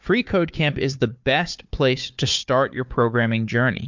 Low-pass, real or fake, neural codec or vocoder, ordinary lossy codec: 7.2 kHz; fake; codec, 16 kHz, 4 kbps, FunCodec, trained on Chinese and English, 50 frames a second; MP3, 64 kbps